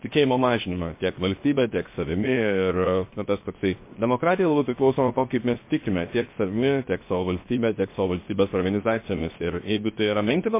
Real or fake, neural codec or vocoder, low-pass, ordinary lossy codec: fake; codec, 16 kHz, 0.7 kbps, FocalCodec; 3.6 kHz; MP3, 24 kbps